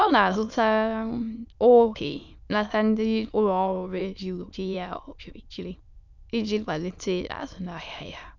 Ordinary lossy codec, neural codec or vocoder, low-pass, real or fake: none; autoencoder, 22.05 kHz, a latent of 192 numbers a frame, VITS, trained on many speakers; 7.2 kHz; fake